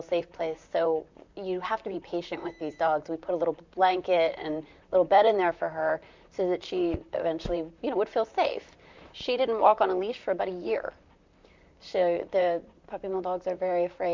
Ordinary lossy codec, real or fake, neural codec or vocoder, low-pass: MP3, 64 kbps; fake; vocoder, 44.1 kHz, 128 mel bands, Pupu-Vocoder; 7.2 kHz